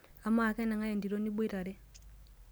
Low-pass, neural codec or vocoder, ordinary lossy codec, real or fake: none; none; none; real